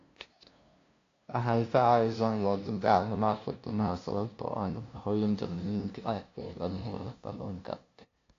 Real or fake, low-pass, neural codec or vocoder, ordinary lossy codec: fake; 7.2 kHz; codec, 16 kHz, 0.5 kbps, FunCodec, trained on LibriTTS, 25 frames a second; AAC, 48 kbps